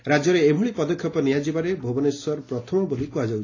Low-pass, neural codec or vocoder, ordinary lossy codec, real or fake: 7.2 kHz; none; AAC, 32 kbps; real